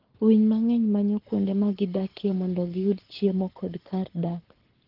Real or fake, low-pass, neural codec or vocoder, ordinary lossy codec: fake; 5.4 kHz; codec, 44.1 kHz, 7.8 kbps, Pupu-Codec; Opus, 16 kbps